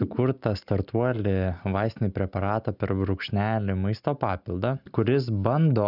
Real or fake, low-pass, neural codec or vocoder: real; 5.4 kHz; none